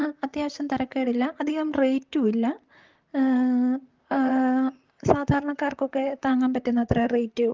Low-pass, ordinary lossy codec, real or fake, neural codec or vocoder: 7.2 kHz; Opus, 32 kbps; fake; vocoder, 44.1 kHz, 128 mel bands, Pupu-Vocoder